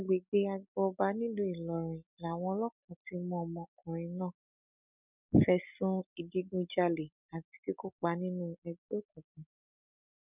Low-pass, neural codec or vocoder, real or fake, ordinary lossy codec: 3.6 kHz; none; real; none